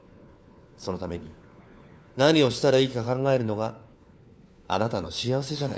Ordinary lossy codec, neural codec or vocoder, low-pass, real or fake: none; codec, 16 kHz, 4 kbps, FunCodec, trained on LibriTTS, 50 frames a second; none; fake